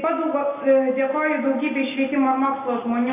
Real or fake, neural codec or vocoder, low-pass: real; none; 3.6 kHz